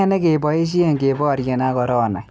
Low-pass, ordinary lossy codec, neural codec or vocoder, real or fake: none; none; none; real